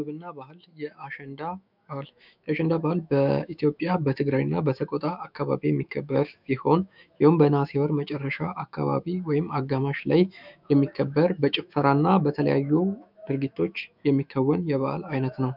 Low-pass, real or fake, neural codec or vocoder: 5.4 kHz; fake; autoencoder, 48 kHz, 128 numbers a frame, DAC-VAE, trained on Japanese speech